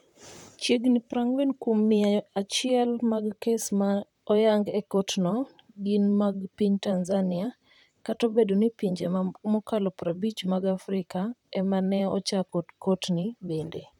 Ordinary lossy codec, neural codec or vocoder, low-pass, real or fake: none; vocoder, 44.1 kHz, 128 mel bands, Pupu-Vocoder; 19.8 kHz; fake